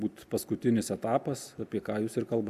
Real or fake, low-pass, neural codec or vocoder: real; 14.4 kHz; none